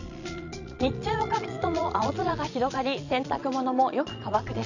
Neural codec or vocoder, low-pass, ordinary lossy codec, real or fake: vocoder, 22.05 kHz, 80 mel bands, Vocos; 7.2 kHz; none; fake